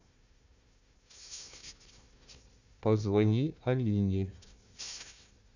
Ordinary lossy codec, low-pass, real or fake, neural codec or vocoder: none; 7.2 kHz; fake; codec, 16 kHz, 1 kbps, FunCodec, trained on Chinese and English, 50 frames a second